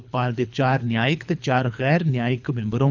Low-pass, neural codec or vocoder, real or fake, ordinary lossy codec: 7.2 kHz; codec, 24 kHz, 3 kbps, HILCodec; fake; none